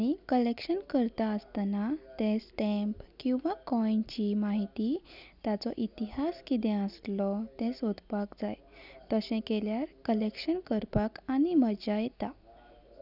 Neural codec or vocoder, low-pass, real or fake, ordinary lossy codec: none; 5.4 kHz; real; none